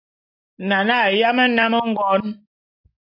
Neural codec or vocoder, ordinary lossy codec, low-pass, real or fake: none; AAC, 48 kbps; 5.4 kHz; real